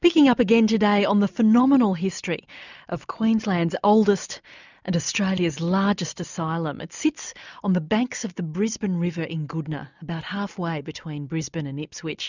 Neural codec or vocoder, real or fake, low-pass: none; real; 7.2 kHz